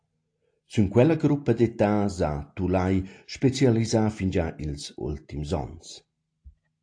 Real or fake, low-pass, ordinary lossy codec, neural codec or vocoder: real; 9.9 kHz; AAC, 48 kbps; none